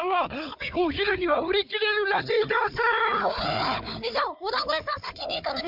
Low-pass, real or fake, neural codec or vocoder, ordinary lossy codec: 5.4 kHz; fake; codec, 16 kHz, 4 kbps, FunCodec, trained on Chinese and English, 50 frames a second; MP3, 48 kbps